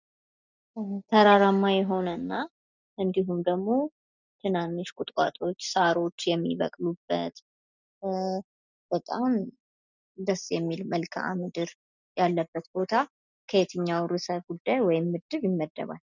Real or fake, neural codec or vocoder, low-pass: real; none; 7.2 kHz